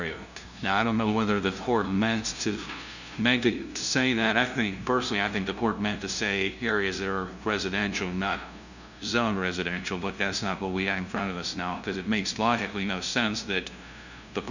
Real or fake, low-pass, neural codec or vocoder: fake; 7.2 kHz; codec, 16 kHz, 0.5 kbps, FunCodec, trained on LibriTTS, 25 frames a second